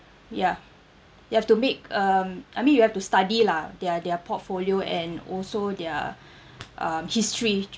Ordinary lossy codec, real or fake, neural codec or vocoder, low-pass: none; real; none; none